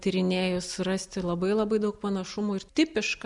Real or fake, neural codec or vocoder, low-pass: real; none; 10.8 kHz